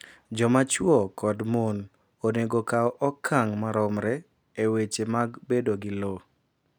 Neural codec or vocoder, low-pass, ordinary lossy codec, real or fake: none; none; none; real